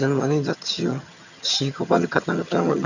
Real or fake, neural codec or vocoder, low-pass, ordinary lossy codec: fake; vocoder, 22.05 kHz, 80 mel bands, HiFi-GAN; 7.2 kHz; none